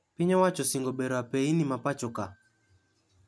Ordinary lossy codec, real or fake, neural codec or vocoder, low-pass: none; real; none; none